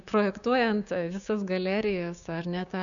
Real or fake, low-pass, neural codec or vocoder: fake; 7.2 kHz; codec, 16 kHz, 6 kbps, DAC